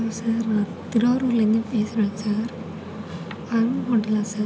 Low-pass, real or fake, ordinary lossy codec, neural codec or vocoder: none; real; none; none